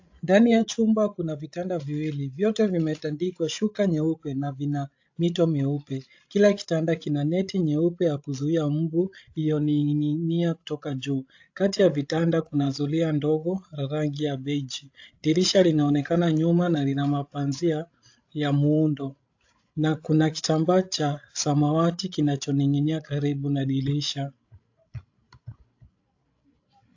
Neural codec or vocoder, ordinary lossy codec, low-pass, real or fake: codec, 16 kHz, 16 kbps, FreqCodec, larger model; AAC, 48 kbps; 7.2 kHz; fake